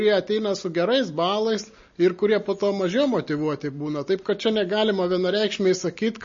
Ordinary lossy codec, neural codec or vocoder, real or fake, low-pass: MP3, 32 kbps; none; real; 7.2 kHz